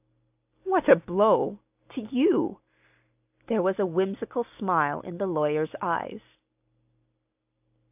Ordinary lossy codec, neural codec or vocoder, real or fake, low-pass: AAC, 32 kbps; none; real; 3.6 kHz